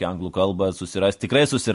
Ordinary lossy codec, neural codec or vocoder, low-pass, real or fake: MP3, 48 kbps; none; 14.4 kHz; real